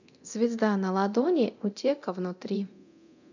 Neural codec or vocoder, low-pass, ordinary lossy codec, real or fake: codec, 24 kHz, 0.9 kbps, DualCodec; 7.2 kHz; none; fake